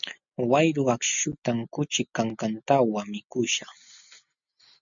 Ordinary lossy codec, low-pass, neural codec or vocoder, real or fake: MP3, 64 kbps; 7.2 kHz; none; real